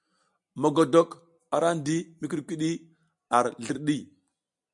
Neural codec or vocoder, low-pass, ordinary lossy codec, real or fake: none; 10.8 kHz; MP3, 96 kbps; real